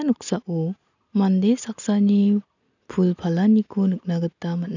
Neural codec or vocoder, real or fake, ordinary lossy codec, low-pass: none; real; none; 7.2 kHz